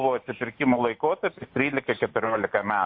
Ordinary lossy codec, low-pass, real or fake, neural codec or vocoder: MP3, 32 kbps; 5.4 kHz; real; none